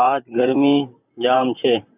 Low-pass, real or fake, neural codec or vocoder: 3.6 kHz; fake; vocoder, 44.1 kHz, 128 mel bands, Pupu-Vocoder